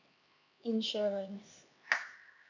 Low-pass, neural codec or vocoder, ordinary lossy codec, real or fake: 7.2 kHz; codec, 16 kHz, 2 kbps, X-Codec, HuBERT features, trained on LibriSpeech; none; fake